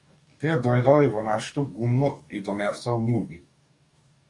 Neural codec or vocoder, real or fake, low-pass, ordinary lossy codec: codec, 44.1 kHz, 2.6 kbps, DAC; fake; 10.8 kHz; MP3, 96 kbps